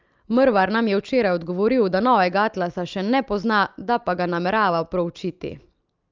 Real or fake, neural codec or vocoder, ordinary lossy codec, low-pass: real; none; Opus, 24 kbps; 7.2 kHz